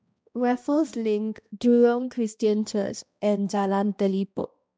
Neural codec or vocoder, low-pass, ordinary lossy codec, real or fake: codec, 16 kHz, 1 kbps, X-Codec, HuBERT features, trained on balanced general audio; none; none; fake